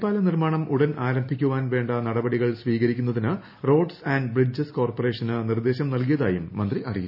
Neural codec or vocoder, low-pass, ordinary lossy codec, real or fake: none; 5.4 kHz; none; real